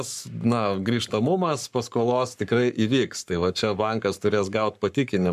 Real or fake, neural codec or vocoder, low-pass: fake; codec, 44.1 kHz, 7.8 kbps, Pupu-Codec; 14.4 kHz